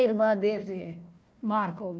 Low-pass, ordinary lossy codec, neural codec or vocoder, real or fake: none; none; codec, 16 kHz, 1 kbps, FunCodec, trained on Chinese and English, 50 frames a second; fake